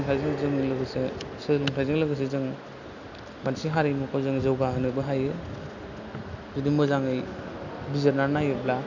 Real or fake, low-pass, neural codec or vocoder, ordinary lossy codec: real; 7.2 kHz; none; none